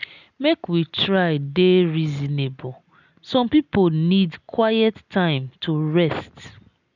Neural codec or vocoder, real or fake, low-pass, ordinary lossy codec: none; real; 7.2 kHz; none